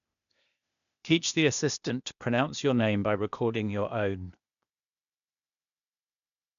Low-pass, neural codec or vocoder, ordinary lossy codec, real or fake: 7.2 kHz; codec, 16 kHz, 0.8 kbps, ZipCodec; none; fake